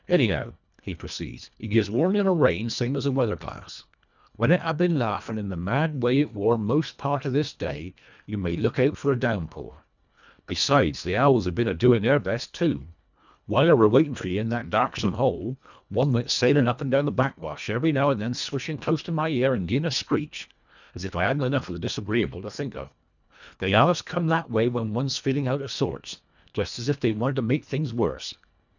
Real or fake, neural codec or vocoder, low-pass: fake; codec, 24 kHz, 1.5 kbps, HILCodec; 7.2 kHz